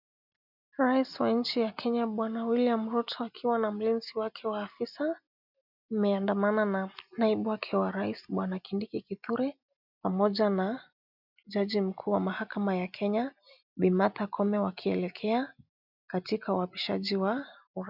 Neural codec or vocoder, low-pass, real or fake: none; 5.4 kHz; real